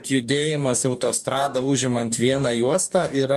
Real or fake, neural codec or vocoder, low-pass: fake; codec, 44.1 kHz, 2.6 kbps, DAC; 14.4 kHz